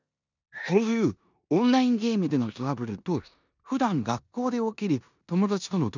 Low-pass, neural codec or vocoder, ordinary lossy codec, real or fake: 7.2 kHz; codec, 16 kHz in and 24 kHz out, 0.9 kbps, LongCat-Audio-Codec, four codebook decoder; none; fake